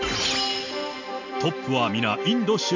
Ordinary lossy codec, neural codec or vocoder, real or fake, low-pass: MP3, 48 kbps; none; real; 7.2 kHz